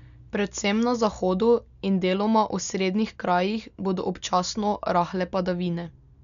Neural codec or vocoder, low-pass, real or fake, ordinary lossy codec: none; 7.2 kHz; real; none